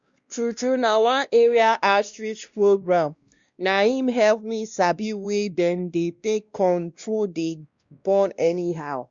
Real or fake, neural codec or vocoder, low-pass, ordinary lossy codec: fake; codec, 16 kHz, 1 kbps, X-Codec, WavLM features, trained on Multilingual LibriSpeech; 7.2 kHz; Opus, 64 kbps